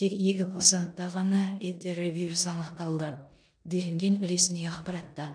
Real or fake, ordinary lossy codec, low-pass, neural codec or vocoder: fake; none; 9.9 kHz; codec, 16 kHz in and 24 kHz out, 0.9 kbps, LongCat-Audio-Codec, four codebook decoder